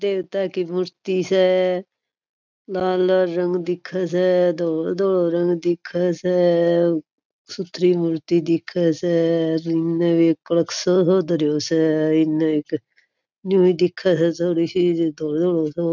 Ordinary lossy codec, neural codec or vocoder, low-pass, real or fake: none; none; 7.2 kHz; real